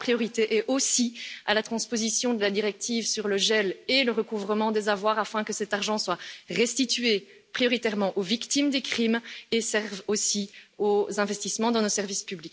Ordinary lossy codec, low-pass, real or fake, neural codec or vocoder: none; none; real; none